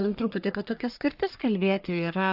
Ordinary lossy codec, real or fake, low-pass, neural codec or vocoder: AAC, 48 kbps; fake; 5.4 kHz; codec, 44.1 kHz, 1.7 kbps, Pupu-Codec